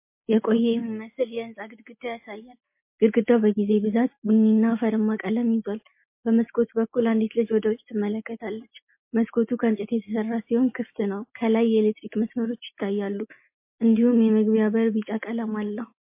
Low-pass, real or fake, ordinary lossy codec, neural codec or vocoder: 3.6 kHz; real; MP3, 24 kbps; none